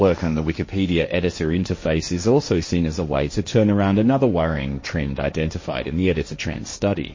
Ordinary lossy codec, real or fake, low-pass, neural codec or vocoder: MP3, 32 kbps; fake; 7.2 kHz; codec, 16 kHz, 1.1 kbps, Voila-Tokenizer